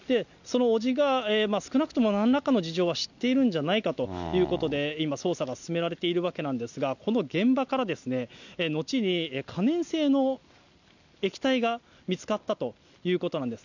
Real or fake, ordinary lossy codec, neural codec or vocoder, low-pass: real; none; none; 7.2 kHz